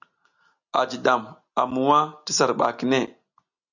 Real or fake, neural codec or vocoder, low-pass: real; none; 7.2 kHz